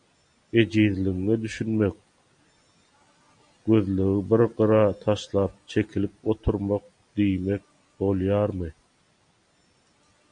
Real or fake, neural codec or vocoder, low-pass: real; none; 9.9 kHz